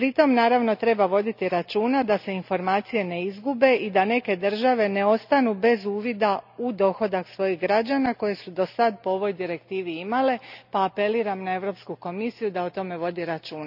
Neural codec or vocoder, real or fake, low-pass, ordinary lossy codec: none; real; 5.4 kHz; none